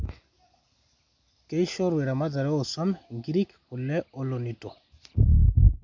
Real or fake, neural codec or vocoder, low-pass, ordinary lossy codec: real; none; 7.2 kHz; MP3, 64 kbps